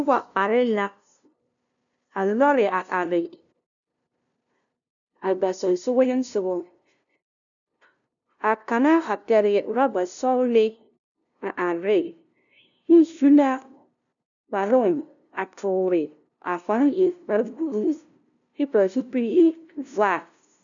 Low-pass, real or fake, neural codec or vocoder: 7.2 kHz; fake; codec, 16 kHz, 0.5 kbps, FunCodec, trained on LibriTTS, 25 frames a second